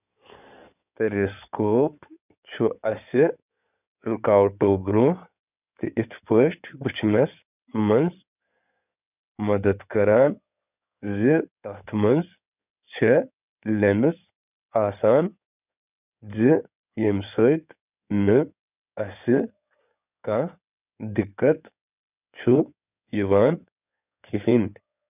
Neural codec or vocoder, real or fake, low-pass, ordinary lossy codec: codec, 16 kHz in and 24 kHz out, 2.2 kbps, FireRedTTS-2 codec; fake; 3.6 kHz; none